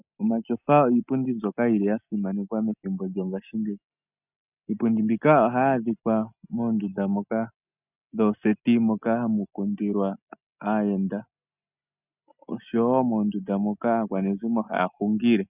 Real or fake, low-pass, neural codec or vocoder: real; 3.6 kHz; none